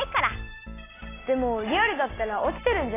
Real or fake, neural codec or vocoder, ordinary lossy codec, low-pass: real; none; AAC, 16 kbps; 3.6 kHz